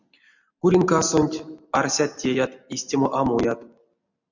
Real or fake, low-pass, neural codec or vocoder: real; 7.2 kHz; none